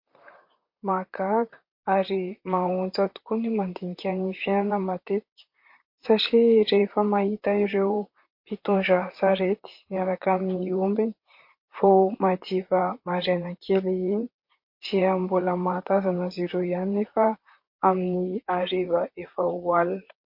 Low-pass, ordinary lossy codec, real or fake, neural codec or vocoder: 5.4 kHz; MP3, 32 kbps; fake; vocoder, 44.1 kHz, 128 mel bands, Pupu-Vocoder